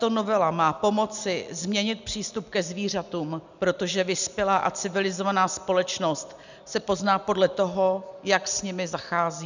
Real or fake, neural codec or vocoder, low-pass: real; none; 7.2 kHz